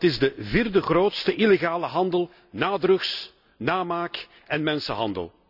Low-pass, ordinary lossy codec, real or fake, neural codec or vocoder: 5.4 kHz; none; real; none